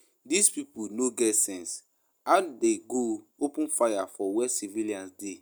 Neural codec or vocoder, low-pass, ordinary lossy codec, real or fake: none; none; none; real